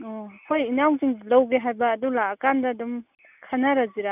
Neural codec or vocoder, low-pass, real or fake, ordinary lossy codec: none; 3.6 kHz; real; none